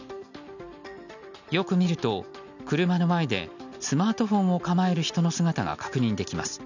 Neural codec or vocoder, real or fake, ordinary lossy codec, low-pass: none; real; none; 7.2 kHz